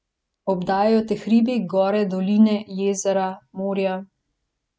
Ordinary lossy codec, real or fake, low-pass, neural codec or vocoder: none; real; none; none